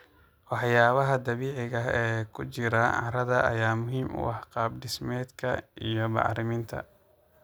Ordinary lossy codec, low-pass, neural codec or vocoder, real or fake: none; none; none; real